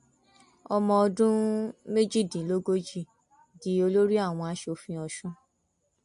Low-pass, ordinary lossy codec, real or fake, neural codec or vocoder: 14.4 kHz; MP3, 48 kbps; real; none